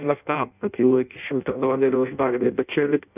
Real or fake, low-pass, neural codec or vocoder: fake; 3.6 kHz; codec, 16 kHz in and 24 kHz out, 0.6 kbps, FireRedTTS-2 codec